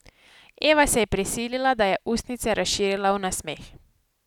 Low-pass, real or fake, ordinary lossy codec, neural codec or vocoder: 19.8 kHz; real; none; none